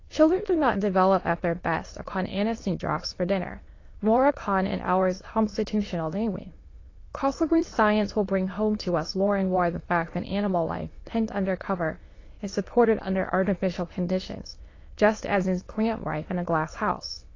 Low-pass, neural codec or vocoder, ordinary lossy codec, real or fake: 7.2 kHz; autoencoder, 22.05 kHz, a latent of 192 numbers a frame, VITS, trained on many speakers; AAC, 32 kbps; fake